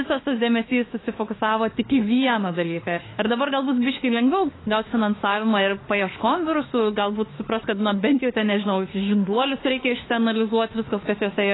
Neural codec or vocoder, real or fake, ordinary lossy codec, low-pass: autoencoder, 48 kHz, 32 numbers a frame, DAC-VAE, trained on Japanese speech; fake; AAC, 16 kbps; 7.2 kHz